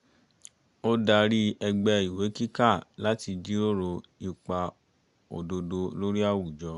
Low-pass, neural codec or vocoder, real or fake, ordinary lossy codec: 10.8 kHz; none; real; Opus, 64 kbps